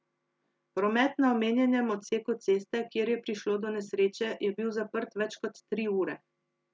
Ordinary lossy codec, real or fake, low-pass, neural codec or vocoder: none; real; none; none